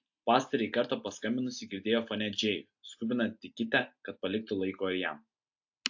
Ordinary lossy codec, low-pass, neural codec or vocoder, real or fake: AAC, 48 kbps; 7.2 kHz; none; real